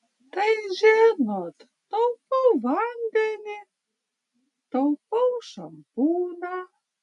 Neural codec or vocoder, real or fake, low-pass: none; real; 10.8 kHz